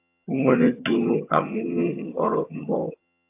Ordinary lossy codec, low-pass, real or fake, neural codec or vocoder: AAC, 24 kbps; 3.6 kHz; fake; vocoder, 22.05 kHz, 80 mel bands, HiFi-GAN